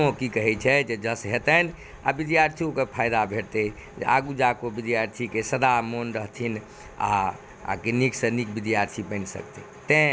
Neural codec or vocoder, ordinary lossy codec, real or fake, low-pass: none; none; real; none